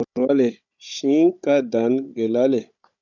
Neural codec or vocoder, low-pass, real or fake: codec, 16 kHz, 16 kbps, FunCodec, trained on Chinese and English, 50 frames a second; 7.2 kHz; fake